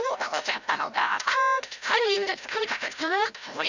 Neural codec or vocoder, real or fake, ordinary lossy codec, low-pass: codec, 16 kHz, 0.5 kbps, FreqCodec, larger model; fake; none; 7.2 kHz